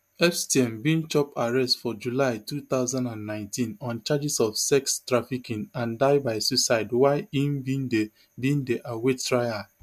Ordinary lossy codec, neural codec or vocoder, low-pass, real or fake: MP3, 96 kbps; none; 14.4 kHz; real